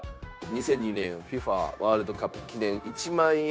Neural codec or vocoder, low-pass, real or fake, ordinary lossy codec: codec, 16 kHz, 0.9 kbps, LongCat-Audio-Codec; none; fake; none